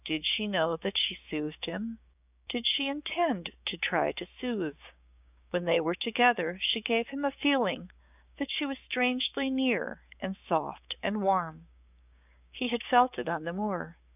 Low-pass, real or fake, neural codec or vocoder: 3.6 kHz; fake; codec, 16 kHz, 6 kbps, DAC